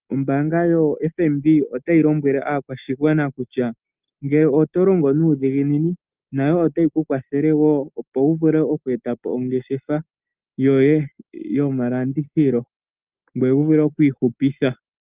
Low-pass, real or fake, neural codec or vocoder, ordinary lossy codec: 3.6 kHz; real; none; Opus, 24 kbps